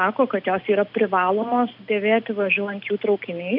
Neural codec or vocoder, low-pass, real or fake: none; 5.4 kHz; real